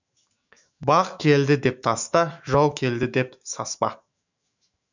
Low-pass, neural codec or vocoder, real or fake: 7.2 kHz; autoencoder, 48 kHz, 128 numbers a frame, DAC-VAE, trained on Japanese speech; fake